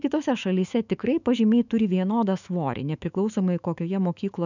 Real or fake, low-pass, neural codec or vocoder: fake; 7.2 kHz; autoencoder, 48 kHz, 128 numbers a frame, DAC-VAE, trained on Japanese speech